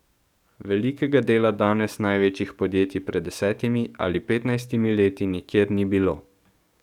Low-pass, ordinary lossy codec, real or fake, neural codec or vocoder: 19.8 kHz; none; fake; codec, 44.1 kHz, 7.8 kbps, DAC